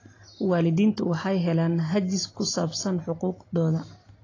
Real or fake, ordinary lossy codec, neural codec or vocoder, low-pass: real; AAC, 32 kbps; none; 7.2 kHz